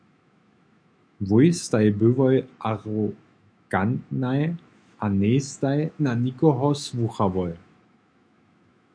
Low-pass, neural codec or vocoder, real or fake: 9.9 kHz; autoencoder, 48 kHz, 128 numbers a frame, DAC-VAE, trained on Japanese speech; fake